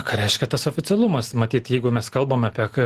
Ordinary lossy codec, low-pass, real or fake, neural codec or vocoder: Opus, 16 kbps; 14.4 kHz; real; none